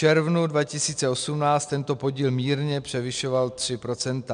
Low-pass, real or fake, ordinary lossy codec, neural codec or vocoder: 9.9 kHz; real; MP3, 96 kbps; none